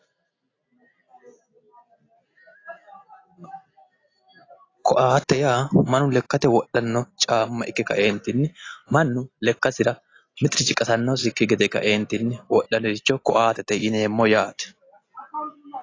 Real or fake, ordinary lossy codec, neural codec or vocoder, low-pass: real; AAC, 32 kbps; none; 7.2 kHz